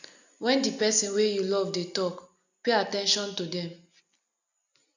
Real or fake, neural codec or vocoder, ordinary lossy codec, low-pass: real; none; none; 7.2 kHz